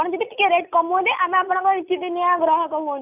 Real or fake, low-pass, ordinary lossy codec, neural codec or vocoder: real; 3.6 kHz; none; none